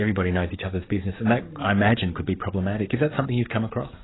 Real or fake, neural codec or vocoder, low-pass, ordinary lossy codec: fake; codec, 44.1 kHz, 7.8 kbps, DAC; 7.2 kHz; AAC, 16 kbps